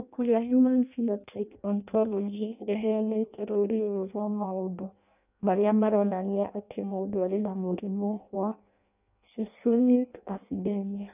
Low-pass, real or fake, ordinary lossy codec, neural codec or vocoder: 3.6 kHz; fake; none; codec, 16 kHz in and 24 kHz out, 0.6 kbps, FireRedTTS-2 codec